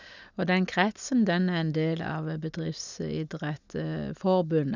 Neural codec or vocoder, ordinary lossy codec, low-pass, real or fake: none; none; 7.2 kHz; real